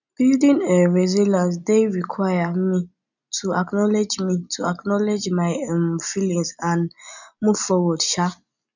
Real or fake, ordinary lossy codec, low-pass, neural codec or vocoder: real; none; 7.2 kHz; none